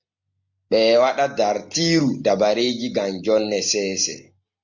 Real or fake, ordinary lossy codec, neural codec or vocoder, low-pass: real; MP3, 48 kbps; none; 7.2 kHz